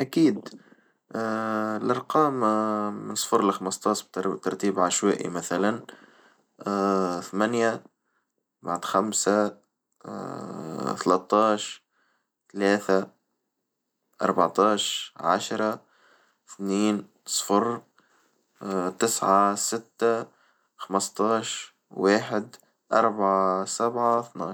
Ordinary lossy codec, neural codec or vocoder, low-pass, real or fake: none; none; none; real